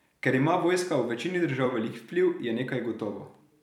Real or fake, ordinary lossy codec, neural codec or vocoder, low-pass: fake; none; vocoder, 44.1 kHz, 128 mel bands every 256 samples, BigVGAN v2; 19.8 kHz